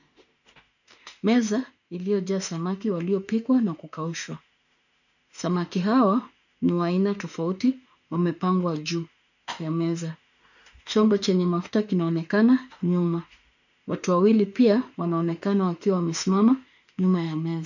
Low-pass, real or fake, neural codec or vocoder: 7.2 kHz; fake; autoencoder, 48 kHz, 32 numbers a frame, DAC-VAE, trained on Japanese speech